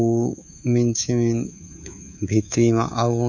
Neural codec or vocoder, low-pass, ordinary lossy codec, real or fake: codec, 24 kHz, 3.1 kbps, DualCodec; 7.2 kHz; none; fake